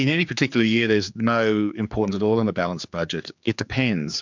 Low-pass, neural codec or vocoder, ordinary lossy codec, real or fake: 7.2 kHz; codec, 16 kHz, 4 kbps, X-Codec, HuBERT features, trained on general audio; MP3, 64 kbps; fake